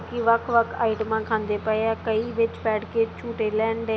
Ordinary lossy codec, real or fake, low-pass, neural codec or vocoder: none; real; none; none